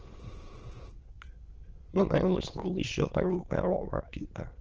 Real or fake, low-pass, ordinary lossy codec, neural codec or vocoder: fake; 7.2 kHz; Opus, 16 kbps; autoencoder, 22.05 kHz, a latent of 192 numbers a frame, VITS, trained on many speakers